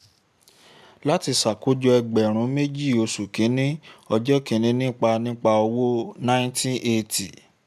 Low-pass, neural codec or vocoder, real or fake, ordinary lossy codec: 14.4 kHz; none; real; none